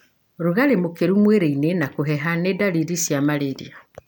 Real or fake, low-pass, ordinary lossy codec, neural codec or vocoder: real; none; none; none